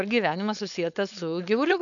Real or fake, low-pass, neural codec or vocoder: fake; 7.2 kHz; codec, 16 kHz, 4.8 kbps, FACodec